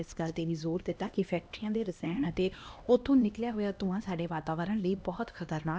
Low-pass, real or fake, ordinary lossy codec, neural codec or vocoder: none; fake; none; codec, 16 kHz, 1 kbps, X-Codec, HuBERT features, trained on LibriSpeech